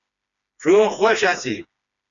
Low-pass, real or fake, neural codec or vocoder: 7.2 kHz; fake; codec, 16 kHz, 2 kbps, FreqCodec, smaller model